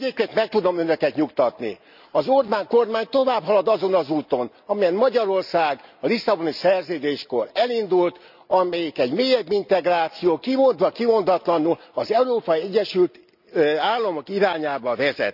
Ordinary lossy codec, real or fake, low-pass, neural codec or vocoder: none; real; 5.4 kHz; none